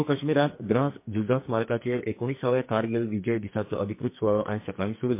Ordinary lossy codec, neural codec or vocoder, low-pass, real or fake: MP3, 24 kbps; codec, 44.1 kHz, 2.6 kbps, SNAC; 3.6 kHz; fake